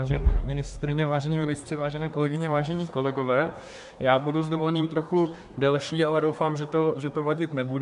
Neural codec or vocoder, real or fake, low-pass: codec, 24 kHz, 1 kbps, SNAC; fake; 10.8 kHz